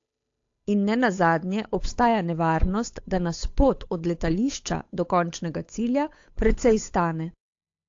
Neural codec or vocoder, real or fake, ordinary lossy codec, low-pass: codec, 16 kHz, 8 kbps, FunCodec, trained on Chinese and English, 25 frames a second; fake; AAC, 48 kbps; 7.2 kHz